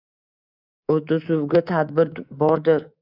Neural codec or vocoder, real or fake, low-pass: codec, 24 kHz, 3.1 kbps, DualCodec; fake; 5.4 kHz